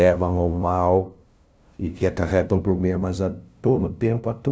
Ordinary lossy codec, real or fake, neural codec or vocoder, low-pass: none; fake; codec, 16 kHz, 0.5 kbps, FunCodec, trained on LibriTTS, 25 frames a second; none